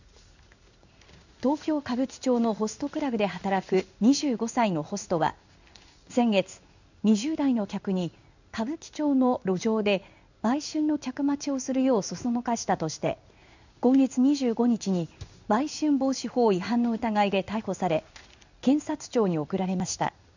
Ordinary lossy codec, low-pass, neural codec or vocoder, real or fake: none; 7.2 kHz; codec, 16 kHz in and 24 kHz out, 1 kbps, XY-Tokenizer; fake